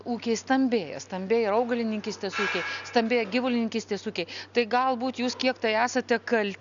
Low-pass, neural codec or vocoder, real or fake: 7.2 kHz; none; real